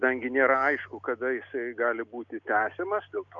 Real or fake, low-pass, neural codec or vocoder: real; 7.2 kHz; none